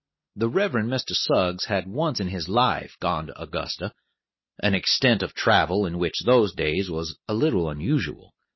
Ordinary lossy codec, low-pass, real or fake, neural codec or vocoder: MP3, 24 kbps; 7.2 kHz; real; none